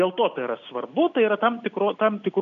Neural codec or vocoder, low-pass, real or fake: none; 7.2 kHz; real